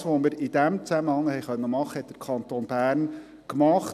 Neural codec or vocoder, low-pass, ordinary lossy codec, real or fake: none; 14.4 kHz; none; real